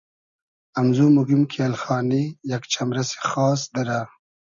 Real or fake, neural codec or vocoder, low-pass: real; none; 7.2 kHz